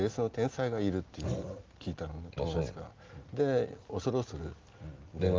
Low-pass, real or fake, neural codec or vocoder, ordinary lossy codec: 7.2 kHz; real; none; Opus, 32 kbps